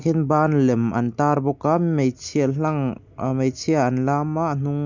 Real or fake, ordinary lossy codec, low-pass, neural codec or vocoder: real; none; 7.2 kHz; none